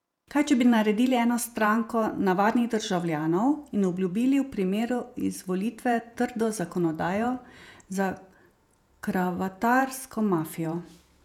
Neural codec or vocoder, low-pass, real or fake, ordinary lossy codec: vocoder, 48 kHz, 128 mel bands, Vocos; 19.8 kHz; fake; none